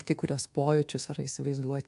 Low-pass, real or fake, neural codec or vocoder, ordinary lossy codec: 10.8 kHz; fake; codec, 24 kHz, 1.2 kbps, DualCodec; AAC, 64 kbps